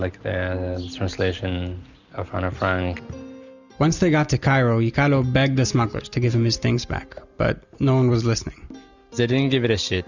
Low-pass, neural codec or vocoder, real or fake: 7.2 kHz; none; real